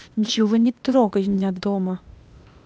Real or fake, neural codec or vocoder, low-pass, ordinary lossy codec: fake; codec, 16 kHz, 0.8 kbps, ZipCodec; none; none